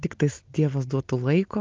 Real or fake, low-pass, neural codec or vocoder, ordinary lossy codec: real; 7.2 kHz; none; Opus, 24 kbps